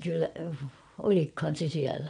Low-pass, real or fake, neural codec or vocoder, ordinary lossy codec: 9.9 kHz; fake; vocoder, 22.05 kHz, 80 mel bands, WaveNeXt; none